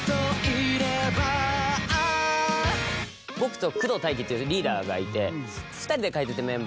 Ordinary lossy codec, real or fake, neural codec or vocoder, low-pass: none; real; none; none